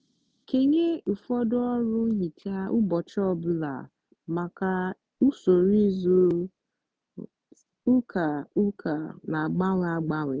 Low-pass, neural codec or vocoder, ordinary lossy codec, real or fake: none; none; none; real